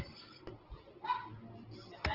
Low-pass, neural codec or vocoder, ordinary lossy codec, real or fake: 5.4 kHz; none; Opus, 16 kbps; real